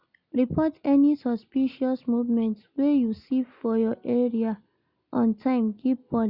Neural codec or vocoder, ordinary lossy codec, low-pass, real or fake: none; none; 5.4 kHz; real